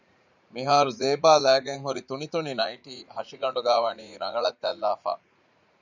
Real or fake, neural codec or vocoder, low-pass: fake; vocoder, 44.1 kHz, 80 mel bands, Vocos; 7.2 kHz